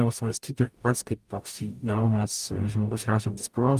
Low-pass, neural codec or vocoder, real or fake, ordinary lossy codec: 14.4 kHz; codec, 44.1 kHz, 0.9 kbps, DAC; fake; Opus, 32 kbps